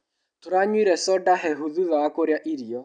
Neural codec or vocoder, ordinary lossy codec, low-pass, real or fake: none; none; 9.9 kHz; real